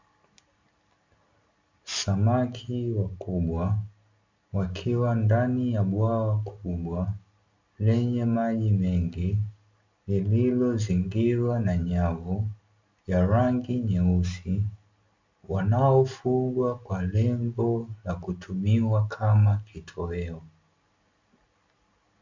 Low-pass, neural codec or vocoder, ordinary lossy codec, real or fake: 7.2 kHz; none; MP3, 64 kbps; real